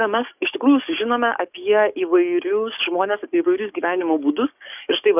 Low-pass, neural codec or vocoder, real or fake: 3.6 kHz; codec, 44.1 kHz, 7.8 kbps, DAC; fake